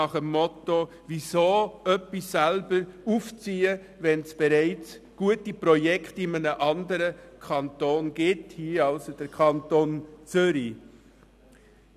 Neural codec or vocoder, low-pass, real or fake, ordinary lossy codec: none; 14.4 kHz; real; none